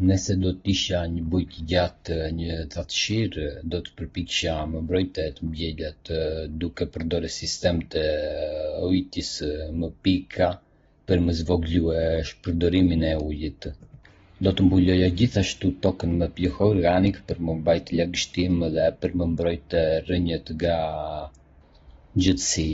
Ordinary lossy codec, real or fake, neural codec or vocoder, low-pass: AAC, 24 kbps; real; none; 7.2 kHz